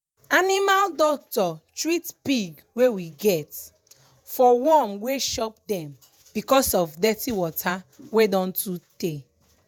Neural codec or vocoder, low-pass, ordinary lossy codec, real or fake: vocoder, 48 kHz, 128 mel bands, Vocos; none; none; fake